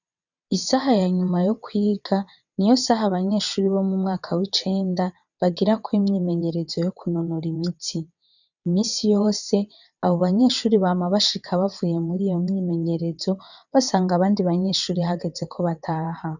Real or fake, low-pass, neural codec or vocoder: fake; 7.2 kHz; vocoder, 22.05 kHz, 80 mel bands, WaveNeXt